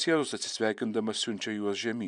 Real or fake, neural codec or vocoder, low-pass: fake; vocoder, 44.1 kHz, 128 mel bands every 512 samples, BigVGAN v2; 10.8 kHz